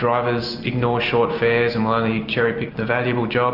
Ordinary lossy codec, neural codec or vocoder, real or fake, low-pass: Opus, 64 kbps; none; real; 5.4 kHz